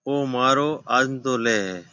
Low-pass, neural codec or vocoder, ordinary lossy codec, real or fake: 7.2 kHz; none; MP3, 48 kbps; real